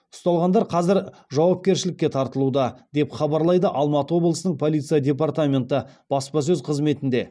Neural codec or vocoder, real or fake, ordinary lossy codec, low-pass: none; real; none; none